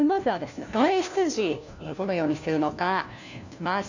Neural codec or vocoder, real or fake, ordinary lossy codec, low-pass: codec, 16 kHz, 1 kbps, FunCodec, trained on LibriTTS, 50 frames a second; fake; none; 7.2 kHz